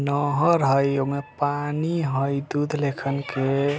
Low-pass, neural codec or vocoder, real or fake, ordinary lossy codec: none; none; real; none